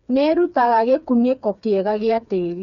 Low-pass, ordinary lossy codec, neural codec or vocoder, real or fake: 7.2 kHz; none; codec, 16 kHz, 4 kbps, FreqCodec, smaller model; fake